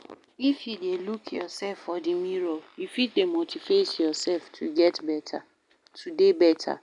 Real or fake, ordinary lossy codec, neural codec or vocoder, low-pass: real; none; none; 10.8 kHz